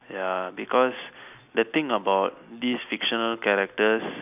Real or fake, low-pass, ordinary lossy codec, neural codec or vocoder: real; 3.6 kHz; none; none